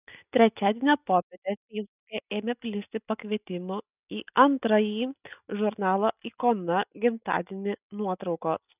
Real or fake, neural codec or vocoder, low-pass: real; none; 3.6 kHz